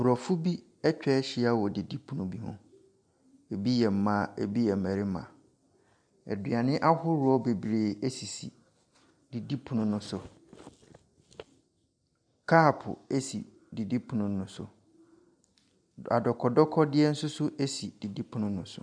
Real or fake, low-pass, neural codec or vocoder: real; 9.9 kHz; none